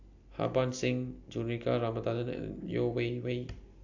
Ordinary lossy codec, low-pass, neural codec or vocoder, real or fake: AAC, 48 kbps; 7.2 kHz; none; real